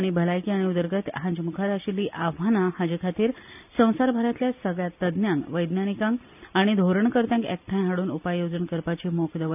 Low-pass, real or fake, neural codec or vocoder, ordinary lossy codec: 3.6 kHz; real; none; none